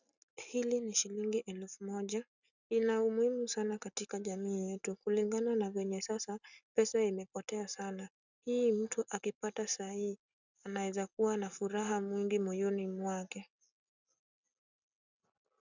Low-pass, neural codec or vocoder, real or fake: 7.2 kHz; none; real